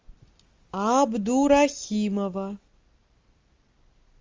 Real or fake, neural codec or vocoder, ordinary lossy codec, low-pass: real; none; Opus, 32 kbps; 7.2 kHz